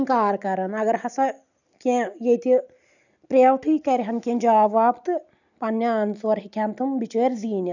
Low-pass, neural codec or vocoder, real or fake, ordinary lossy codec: 7.2 kHz; none; real; none